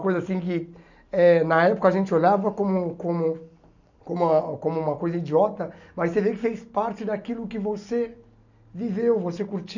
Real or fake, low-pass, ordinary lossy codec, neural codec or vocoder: real; 7.2 kHz; none; none